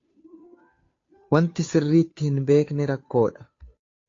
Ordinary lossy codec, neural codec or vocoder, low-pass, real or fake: AAC, 32 kbps; codec, 16 kHz, 2 kbps, FunCodec, trained on Chinese and English, 25 frames a second; 7.2 kHz; fake